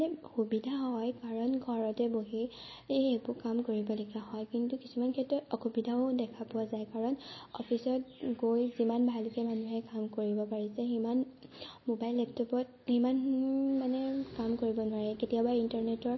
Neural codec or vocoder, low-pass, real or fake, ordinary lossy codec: none; 7.2 kHz; real; MP3, 24 kbps